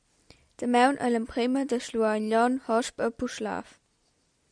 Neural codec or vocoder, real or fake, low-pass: none; real; 9.9 kHz